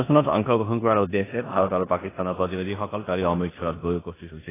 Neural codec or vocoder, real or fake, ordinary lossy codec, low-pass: codec, 16 kHz in and 24 kHz out, 0.9 kbps, LongCat-Audio-Codec, four codebook decoder; fake; AAC, 16 kbps; 3.6 kHz